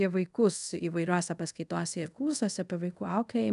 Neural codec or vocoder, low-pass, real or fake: codec, 24 kHz, 0.5 kbps, DualCodec; 10.8 kHz; fake